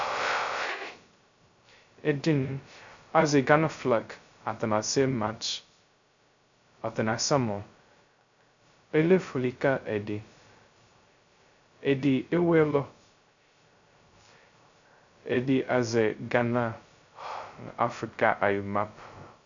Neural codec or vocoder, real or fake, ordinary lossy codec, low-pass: codec, 16 kHz, 0.2 kbps, FocalCodec; fake; MP3, 64 kbps; 7.2 kHz